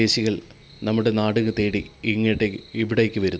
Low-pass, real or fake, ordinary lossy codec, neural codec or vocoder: none; real; none; none